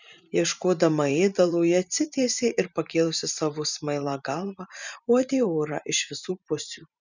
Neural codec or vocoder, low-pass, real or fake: vocoder, 44.1 kHz, 128 mel bands every 512 samples, BigVGAN v2; 7.2 kHz; fake